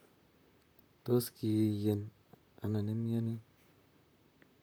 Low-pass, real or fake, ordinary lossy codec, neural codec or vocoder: none; fake; none; vocoder, 44.1 kHz, 128 mel bands, Pupu-Vocoder